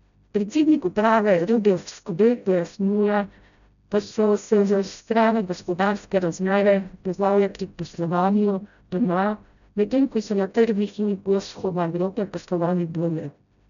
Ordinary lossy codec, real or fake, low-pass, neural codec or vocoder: none; fake; 7.2 kHz; codec, 16 kHz, 0.5 kbps, FreqCodec, smaller model